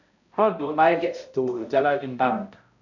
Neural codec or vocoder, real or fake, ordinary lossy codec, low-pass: codec, 16 kHz, 0.5 kbps, X-Codec, HuBERT features, trained on balanced general audio; fake; none; 7.2 kHz